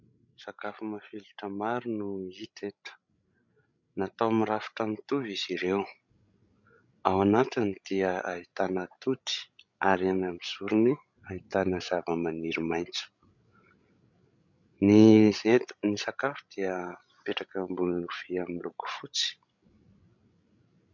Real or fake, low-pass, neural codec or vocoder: fake; 7.2 kHz; codec, 16 kHz, 16 kbps, FreqCodec, larger model